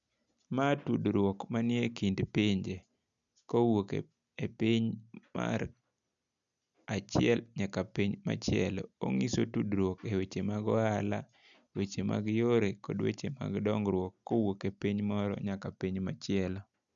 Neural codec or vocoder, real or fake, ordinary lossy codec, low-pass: none; real; none; 7.2 kHz